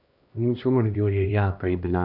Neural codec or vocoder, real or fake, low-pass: codec, 16 kHz, 1 kbps, X-Codec, HuBERT features, trained on LibriSpeech; fake; 5.4 kHz